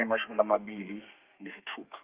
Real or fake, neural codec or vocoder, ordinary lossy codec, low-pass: fake; codec, 32 kHz, 1.9 kbps, SNAC; Opus, 32 kbps; 3.6 kHz